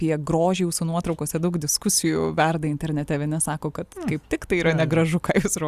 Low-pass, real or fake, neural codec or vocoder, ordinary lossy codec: 14.4 kHz; real; none; Opus, 64 kbps